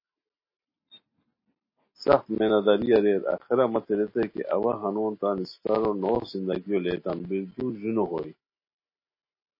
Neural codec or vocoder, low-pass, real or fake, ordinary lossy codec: none; 5.4 kHz; real; MP3, 24 kbps